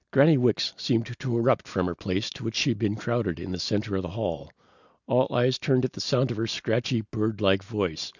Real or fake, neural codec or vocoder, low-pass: real; none; 7.2 kHz